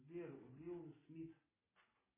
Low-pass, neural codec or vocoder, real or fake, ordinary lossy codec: 3.6 kHz; none; real; MP3, 16 kbps